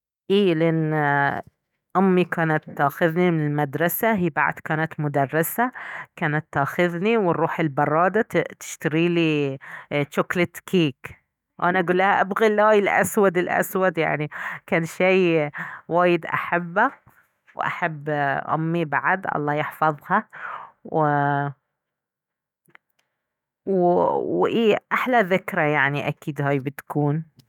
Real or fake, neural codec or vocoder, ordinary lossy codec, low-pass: fake; autoencoder, 48 kHz, 128 numbers a frame, DAC-VAE, trained on Japanese speech; none; 19.8 kHz